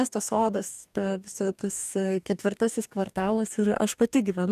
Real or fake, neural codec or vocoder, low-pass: fake; codec, 44.1 kHz, 2.6 kbps, DAC; 14.4 kHz